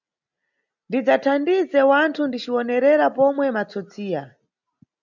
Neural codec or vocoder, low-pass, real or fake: none; 7.2 kHz; real